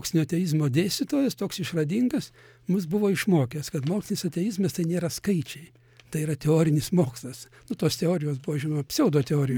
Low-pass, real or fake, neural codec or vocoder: 19.8 kHz; real; none